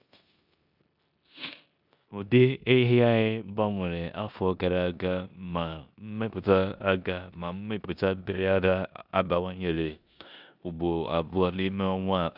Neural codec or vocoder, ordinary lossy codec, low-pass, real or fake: codec, 16 kHz in and 24 kHz out, 0.9 kbps, LongCat-Audio-Codec, four codebook decoder; none; 5.4 kHz; fake